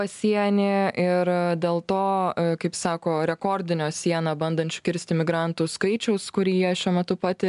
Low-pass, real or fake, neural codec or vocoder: 10.8 kHz; real; none